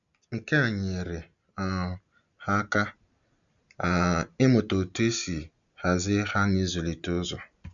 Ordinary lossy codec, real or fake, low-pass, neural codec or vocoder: none; real; 7.2 kHz; none